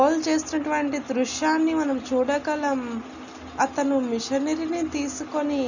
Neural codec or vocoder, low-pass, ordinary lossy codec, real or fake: none; 7.2 kHz; none; real